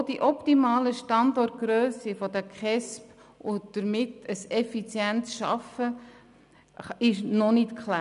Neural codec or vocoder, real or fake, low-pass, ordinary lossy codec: none; real; 10.8 kHz; none